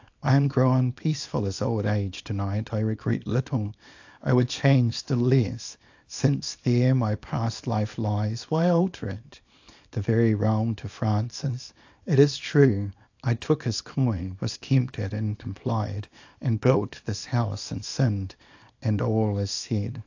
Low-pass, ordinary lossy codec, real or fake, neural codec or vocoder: 7.2 kHz; AAC, 48 kbps; fake; codec, 24 kHz, 0.9 kbps, WavTokenizer, small release